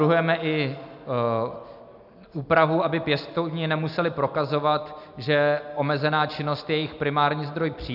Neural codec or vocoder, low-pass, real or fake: none; 5.4 kHz; real